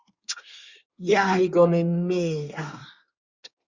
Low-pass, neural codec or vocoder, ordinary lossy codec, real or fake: 7.2 kHz; codec, 24 kHz, 1 kbps, SNAC; Opus, 64 kbps; fake